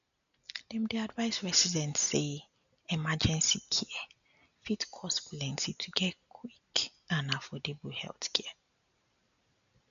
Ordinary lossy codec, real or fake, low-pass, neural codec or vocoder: none; real; 7.2 kHz; none